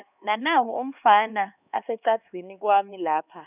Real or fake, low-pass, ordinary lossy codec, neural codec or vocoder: fake; 3.6 kHz; none; codec, 16 kHz, 2 kbps, X-Codec, HuBERT features, trained on LibriSpeech